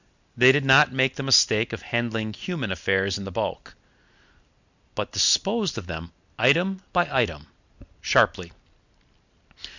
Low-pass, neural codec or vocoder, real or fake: 7.2 kHz; none; real